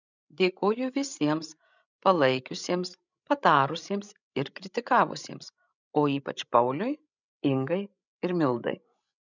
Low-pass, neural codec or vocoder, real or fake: 7.2 kHz; codec, 16 kHz, 16 kbps, FreqCodec, larger model; fake